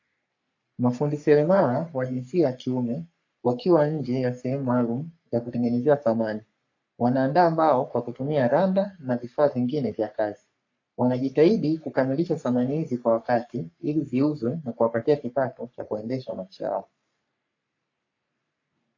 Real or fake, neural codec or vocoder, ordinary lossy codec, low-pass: fake; codec, 44.1 kHz, 3.4 kbps, Pupu-Codec; AAC, 48 kbps; 7.2 kHz